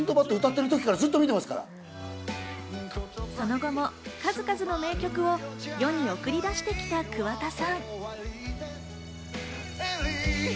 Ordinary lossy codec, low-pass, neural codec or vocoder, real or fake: none; none; none; real